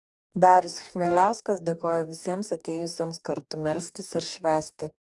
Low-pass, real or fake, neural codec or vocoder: 10.8 kHz; fake; codec, 44.1 kHz, 2.6 kbps, DAC